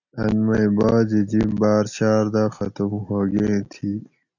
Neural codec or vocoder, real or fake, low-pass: none; real; 7.2 kHz